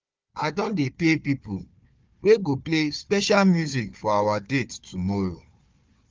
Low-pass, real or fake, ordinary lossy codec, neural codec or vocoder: 7.2 kHz; fake; Opus, 24 kbps; codec, 16 kHz, 4 kbps, FunCodec, trained on Chinese and English, 50 frames a second